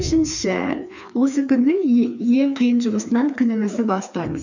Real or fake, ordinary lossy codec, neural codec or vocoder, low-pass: fake; none; codec, 16 kHz, 2 kbps, FreqCodec, larger model; 7.2 kHz